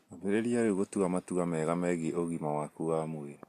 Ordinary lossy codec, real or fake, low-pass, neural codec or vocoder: AAC, 64 kbps; real; 14.4 kHz; none